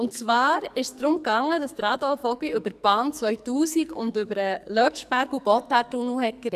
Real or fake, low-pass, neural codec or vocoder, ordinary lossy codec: fake; 14.4 kHz; codec, 44.1 kHz, 2.6 kbps, SNAC; none